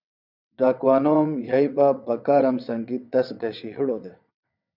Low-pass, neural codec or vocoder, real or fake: 5.4 kHz; vocoder, 22.05 kHz, 80 mel bands, WaveNeXt; fake